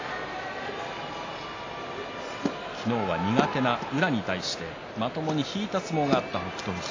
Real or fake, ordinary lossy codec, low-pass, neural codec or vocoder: real; AAC, 32 kbps; 7.2 kHz; none